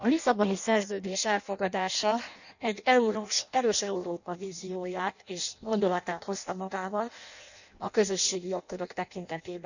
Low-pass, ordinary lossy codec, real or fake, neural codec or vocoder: 7.2 kHz; none; fake; codec, 16 kHz in and 24 kHz out, 0.6 kbps, FireRedTTS-2 codec